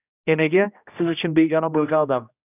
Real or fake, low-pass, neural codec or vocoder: fake; 3.6 kHz; codec, 16 kHz, 1 kbps, X-Codec, HuBERT features, trained on general audio